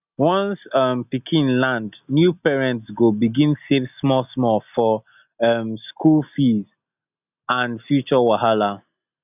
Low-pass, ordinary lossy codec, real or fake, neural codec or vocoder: 3.6 kHz; none; real; none